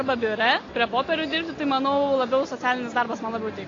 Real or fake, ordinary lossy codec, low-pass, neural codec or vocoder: real; AAC, 32 kbps; 7.2 kHz; none